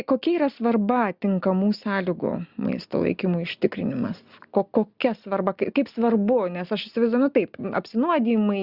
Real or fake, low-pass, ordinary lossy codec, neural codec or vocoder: real; 5.4 kHz; Opus, 64 kbps; none